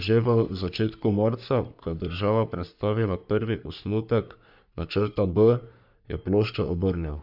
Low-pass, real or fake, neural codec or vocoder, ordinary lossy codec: 5.4 kHz; fake; codec, 44.1 kHz, 3.4 kbps, Pupu-Codec; none